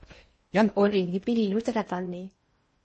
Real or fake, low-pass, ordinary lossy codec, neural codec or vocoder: fake; 10.8 kHz; MP3, 32 kbps; codec, 16 kHz in and 24 kHz out, 0.8 kbps, FocalCodec, streaming, 65536 codes